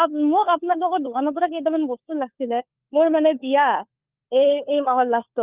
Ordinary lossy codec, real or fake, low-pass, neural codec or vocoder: Opus, 24 kbps; fake; 3.6 kHz; codec, 16 kHz, 4 kbps, FunCodec, trained on LibriTTS, 50 frames a second